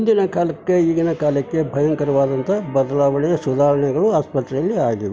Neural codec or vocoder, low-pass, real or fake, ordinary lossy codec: none; none; real; none